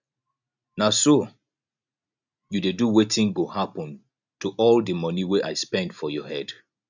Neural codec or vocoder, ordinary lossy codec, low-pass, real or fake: none; none; 7.2 kHz; real